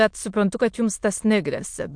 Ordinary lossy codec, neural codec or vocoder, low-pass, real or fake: MP3, 64 kbps; autoencoder, 22.05 kHz, a latent of 192 numbers a frame, VITS, trained on many speakers; 9.9 kHz; fake